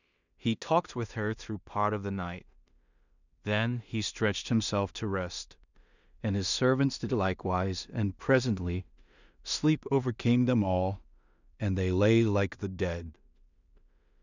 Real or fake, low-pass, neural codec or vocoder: fake; 7.2 kHz; codec, 16 kHz in and 24 kHz out, 0.4 kbps, LongCat-Audio-Codec, two codebook decoder